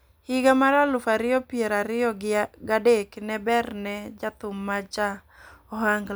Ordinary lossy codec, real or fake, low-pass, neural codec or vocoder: none; real; none; none